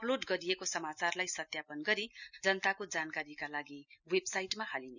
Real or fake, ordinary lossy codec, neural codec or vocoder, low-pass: real; none; none; 7.2 kHz